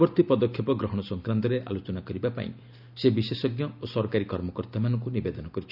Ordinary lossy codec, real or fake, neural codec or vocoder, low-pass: none; real; none; 5.4 kHz